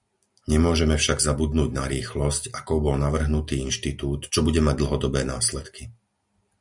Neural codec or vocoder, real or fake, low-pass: none; real; 10.8 kHz